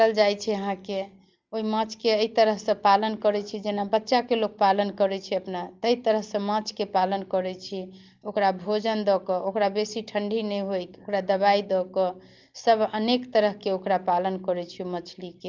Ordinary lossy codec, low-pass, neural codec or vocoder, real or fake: Opus, 32 kbps; 7.2 kHz; none; real